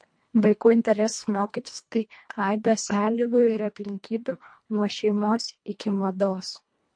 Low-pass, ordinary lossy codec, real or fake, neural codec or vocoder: 9.9 kHz; MP3, 48 kbps; fake; codec, 24 kHz, 1.5 kbps, HILCodec